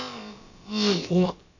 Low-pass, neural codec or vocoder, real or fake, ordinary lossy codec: 7.2 kHz; codec, 16 kHz, about 1 kbps, DyCAST, with the encoder's durations; fake; none